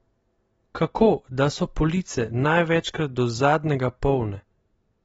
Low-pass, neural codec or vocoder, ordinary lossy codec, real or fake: 19.8 kHz; none; AAC, 24 kbps; real